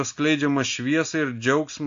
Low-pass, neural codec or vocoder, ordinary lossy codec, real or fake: 7.2 kHz; none; AAC, 64 kbps; real